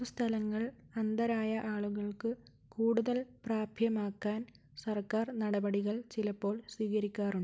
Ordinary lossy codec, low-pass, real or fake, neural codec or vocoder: none; none; real; none